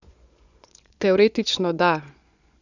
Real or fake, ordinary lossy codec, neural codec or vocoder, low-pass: fake; none; vocoder, 24 kHz, 100 mel bands, Vocos; 7.2 kHz